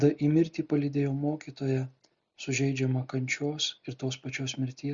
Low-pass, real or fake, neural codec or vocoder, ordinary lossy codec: 7.2 kHz; real; none; Opus, 64 kbps